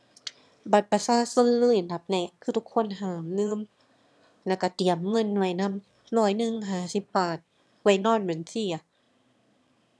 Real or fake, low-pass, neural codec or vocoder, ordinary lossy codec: fake; none; autoencoder, 22.05 kHz, a latent of 192 numbers a frame, VITS, trained on one speaker; none